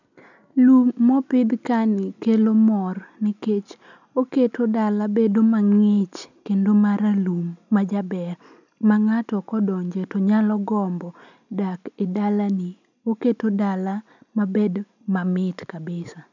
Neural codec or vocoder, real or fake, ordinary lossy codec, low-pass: none; real; none; 7.2 kHz